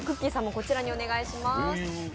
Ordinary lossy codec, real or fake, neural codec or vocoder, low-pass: none; real; none; none